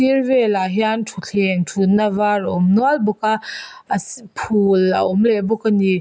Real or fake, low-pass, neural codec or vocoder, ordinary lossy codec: real; none; none; none